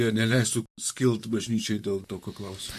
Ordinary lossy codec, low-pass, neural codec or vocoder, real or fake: MP3, 64 kbps; 14.4 kHz; vocoder, 44.1 kHz, 128 mel bands, Pupu-Vocoder; fake